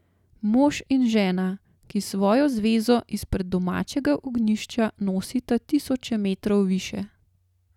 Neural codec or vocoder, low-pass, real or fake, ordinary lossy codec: none; 19.8 kHz; real; none